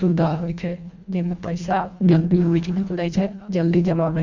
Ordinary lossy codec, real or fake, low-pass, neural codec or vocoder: none; fake; 7.2 kHz; codec, 24 kHz, 1.5 kbps, HILCodec